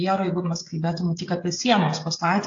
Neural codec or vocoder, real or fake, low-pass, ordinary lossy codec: codec, 16 kHz, 16 kbps, FreqCodec, smaller model; fake; 7.2 kHz; AAC, 48 kbps